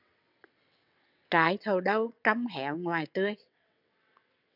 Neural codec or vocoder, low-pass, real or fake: vocoder, 22.05 kHz, 80 mel bands, WaveNeXt; 5.4 kHz; fake